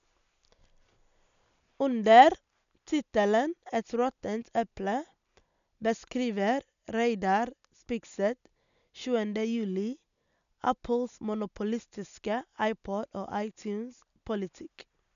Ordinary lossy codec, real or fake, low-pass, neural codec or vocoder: none; real; 7.2 kHz; none